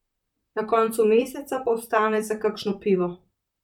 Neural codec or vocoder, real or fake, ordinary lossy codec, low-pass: vocoder, 44.1 kHz, 128 mel bands, Pupu-Vocoder; fake; none; 19.8 kHz